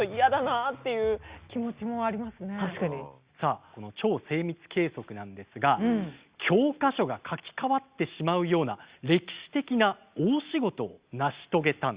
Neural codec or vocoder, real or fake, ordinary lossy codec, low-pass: none; real; Opus, 64 kbps; 3.6 kHz